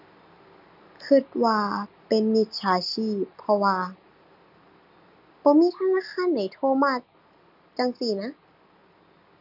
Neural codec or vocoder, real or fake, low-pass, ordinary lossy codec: none; real; 5.4 kHz; none